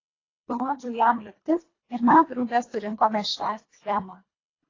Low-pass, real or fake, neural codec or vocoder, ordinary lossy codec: 7.2 kHz; fake; codec, 24 kHz, 1.5 kbps, HILCodec; AAC, 32 kbps